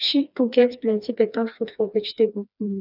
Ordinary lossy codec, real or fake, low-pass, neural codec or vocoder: none; fake; 5.4 kHz; codec, 16 kHz, 2 kbps, FreqCodec, smaller model